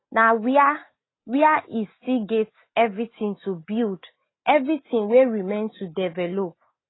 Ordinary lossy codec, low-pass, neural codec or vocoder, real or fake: AAC, 16 kbps; 7.2 kHz; none; real